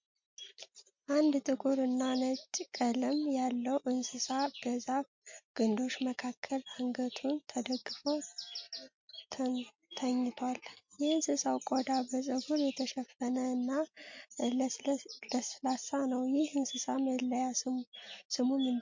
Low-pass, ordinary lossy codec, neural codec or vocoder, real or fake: 7.2 kHz; MP3, 48 kbps; none; real